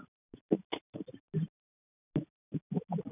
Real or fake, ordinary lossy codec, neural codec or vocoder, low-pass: real; none; none; 3.6 kHz